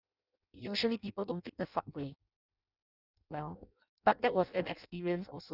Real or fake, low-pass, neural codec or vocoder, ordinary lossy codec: fake; 5.4 kHz; codec, 16 kHz in and 24 kHz out, 0.6 kbps, FireRedTTS-2 codec; none